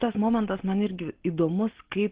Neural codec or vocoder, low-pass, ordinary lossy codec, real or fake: none; 3.6 kHz; Opus, 16 kbps; real